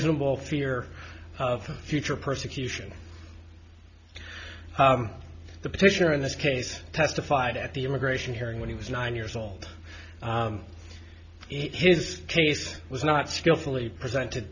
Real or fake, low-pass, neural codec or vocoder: real; 7.2 kHz; none